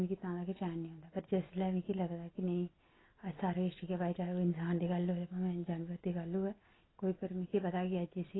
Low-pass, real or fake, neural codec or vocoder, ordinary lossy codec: 7.2 kHz; real; none; AAC, 16 kbps